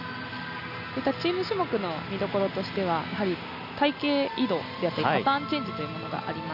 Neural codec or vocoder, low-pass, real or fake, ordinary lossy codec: none; 5.4 kHz; real; none